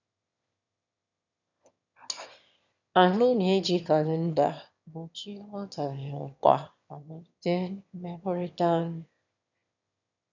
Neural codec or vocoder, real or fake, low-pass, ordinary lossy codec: autoencoder, 22.05 kHz, a latent of 192 numbers a frame, VITS, trained on one speaker; fake; 7.2 kHz; none